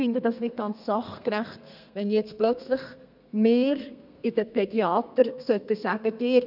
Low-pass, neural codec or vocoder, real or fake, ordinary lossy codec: 5.4 kHz; codec, 32 kHz, 1.9 kbps, SNAC; fake; none